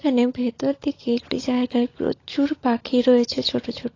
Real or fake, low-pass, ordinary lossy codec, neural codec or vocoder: fake; 7.2 kHz; AAC, 32 kbps; codec, 16 kHz, 16 kbps, FunCodec, trained on LibriTTS, 50 frames a second